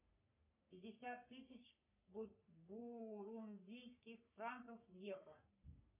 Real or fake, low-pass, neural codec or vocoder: fake; 3.6 kHz; codec, 44.1 kHz, 3.4 kbps, Pupu-Codec